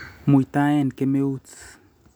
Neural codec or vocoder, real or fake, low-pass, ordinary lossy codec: none; real; none; none